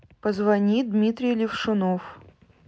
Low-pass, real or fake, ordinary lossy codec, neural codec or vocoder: none; real; none; none